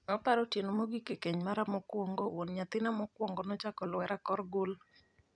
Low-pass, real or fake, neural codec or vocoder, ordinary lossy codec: 9.9 kHz; fake; vocoder, 44.1 kHz, 128 mel bands every 256 samples, BigVGAN v2; none